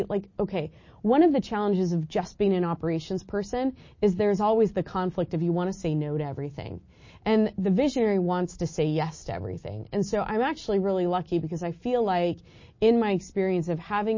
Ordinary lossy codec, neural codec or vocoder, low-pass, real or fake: MP3, 32 kbps; none; 7.2 kHz; real